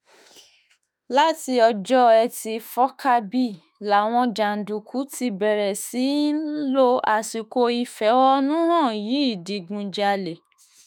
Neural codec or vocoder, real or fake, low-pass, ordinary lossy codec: autoencoder, 48 kHz, 32 numbers a frame, DAC-VAE, trained on Japanese speech; fake; none; none